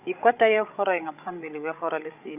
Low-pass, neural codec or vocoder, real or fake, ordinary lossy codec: 3.6 kHz; codec, 16 kHz, 16 kbps, FreqCodec, larger model; fake; none